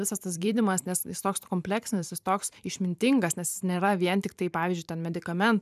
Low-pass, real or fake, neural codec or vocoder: 14.4 kHz; fake; vocoder, 44.1 kHz, 128 mel bands every 512 samples, BigVGAN v2